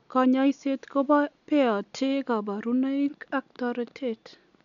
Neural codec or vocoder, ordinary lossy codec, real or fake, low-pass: none; none; real; 7.2 kHz